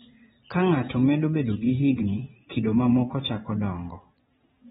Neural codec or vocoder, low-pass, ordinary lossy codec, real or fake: vocoder, 22.05 kHz, 80 mel bands, WaveNeXt; 9.9 kHz; AAC, 16 kbps; fake